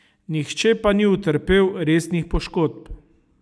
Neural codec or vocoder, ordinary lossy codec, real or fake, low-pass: none; none; real; none